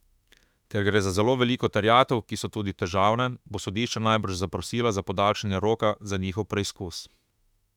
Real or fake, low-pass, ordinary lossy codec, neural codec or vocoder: fake; 19.8 kHz; none; autoencoder, 48 kHz, 32 numbers a frame, DAC-VAE, trained on Japanese speech